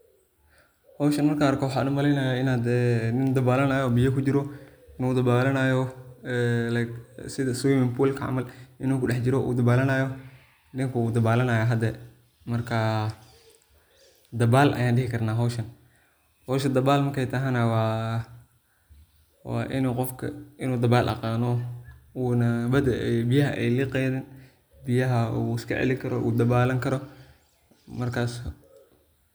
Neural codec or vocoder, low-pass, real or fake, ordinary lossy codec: none; none; real; none